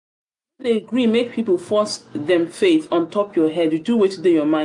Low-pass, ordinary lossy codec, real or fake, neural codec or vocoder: 10.8 kHz; MP3, 64 kbps; real; none